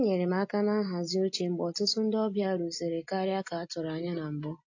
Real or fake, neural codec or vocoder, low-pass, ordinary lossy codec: real; none; 7.2 kHz; AAC, 48 kbps